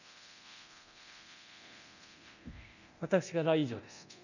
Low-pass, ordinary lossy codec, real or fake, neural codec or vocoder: 7.2 kHz; none; fake; codec, 24 kHz, 0.9 kbps, DualCodec